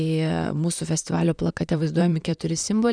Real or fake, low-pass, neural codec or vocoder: fake; 9.9 kHz; vocoder, 44.1 kHz, 128 mel bands, Pupu-Vocoder